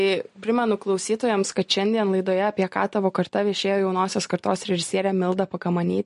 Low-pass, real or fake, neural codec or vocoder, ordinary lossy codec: 10.8 kHz; real; none; MP3, 48 kbps